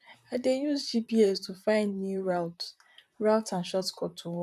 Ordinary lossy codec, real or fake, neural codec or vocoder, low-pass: none; fake; vocoder, 44.1 kHz, 128 mel bands, Pupu-Vocoder; 14.4 kHz